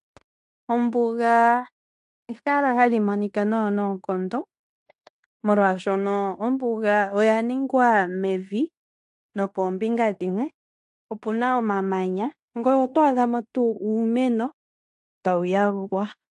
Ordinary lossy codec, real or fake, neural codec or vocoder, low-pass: AAC, 64 kbps; fake; codec, 16 kHz in and 24 kHz out, 0.9 kbps, LongCat-Audio-Codec, fine tuned four codebook decoder; 10.8 kHz